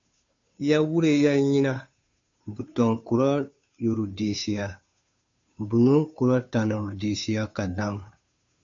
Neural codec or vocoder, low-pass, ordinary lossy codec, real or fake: codec, 16 kHz, 2 kbps, FunCodec, trained on Chinese and English, 25 frames a second; 7.2 kHz; AAC, 64 kbps; fake